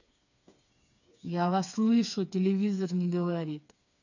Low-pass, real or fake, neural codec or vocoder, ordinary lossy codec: 7.2 kHz; fake; codec, 16 kHz, 4 kbps, FreqCodec, smaller model; none